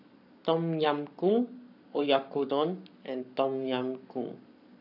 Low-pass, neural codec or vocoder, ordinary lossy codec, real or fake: 5.4 kHz; codec, 44.1 kHz, 7.8 kbps, Pupu-Codec; none; fake